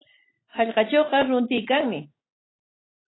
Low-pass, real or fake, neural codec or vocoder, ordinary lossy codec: 7.2 kHz; real; none; AAC, 16 kbps